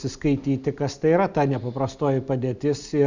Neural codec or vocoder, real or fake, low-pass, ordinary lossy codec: none; real; 7.2 kHz; Opus, 64 kbps